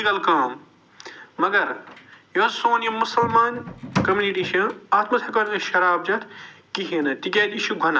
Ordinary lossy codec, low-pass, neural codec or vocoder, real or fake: none; none; none; real